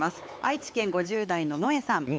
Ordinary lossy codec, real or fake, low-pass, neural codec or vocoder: none; fake; none; codec, 16 kHz, 4 kbps, X-Codec, HuBERT features, trained on LibriSpeech